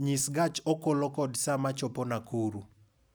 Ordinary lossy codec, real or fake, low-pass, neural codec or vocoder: none; real; none; none